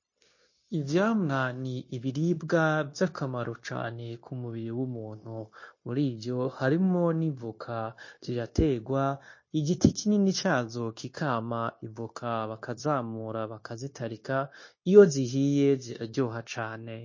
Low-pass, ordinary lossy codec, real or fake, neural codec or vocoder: 7.2 kHz; MP3, 32 kbps; fake; codec, 16 kHz, 0.9 kbps, LongCat-Audio-Codec